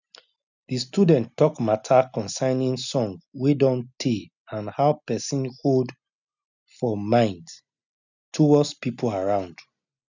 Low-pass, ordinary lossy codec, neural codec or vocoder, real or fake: 7.2 kHz; none; none; real